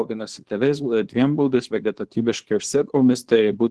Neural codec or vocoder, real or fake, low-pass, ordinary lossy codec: codec, 24 kHz, 0.9 kbps, WavTokenizer, small release; fake; 10.8 kHz; Opus, 16 kbps